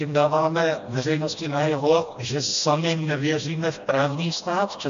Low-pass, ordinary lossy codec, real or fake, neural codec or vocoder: 7.2 kHz; MP3, 64 kbps; fake; codec, 16 kHz, 1 kbps, FreqCodec, smaller model